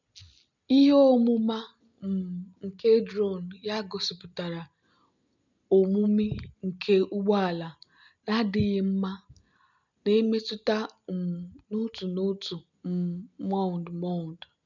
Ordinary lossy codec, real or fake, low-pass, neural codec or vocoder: none; real; 7.2 kHz; none